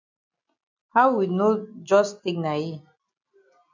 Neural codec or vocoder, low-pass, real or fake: none; 7.2 kHz; real